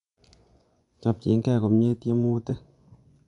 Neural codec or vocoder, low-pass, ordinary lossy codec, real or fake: vocoder, 24 kHz, 100 mel bands, Vocos; 10.8 kHz; none; fake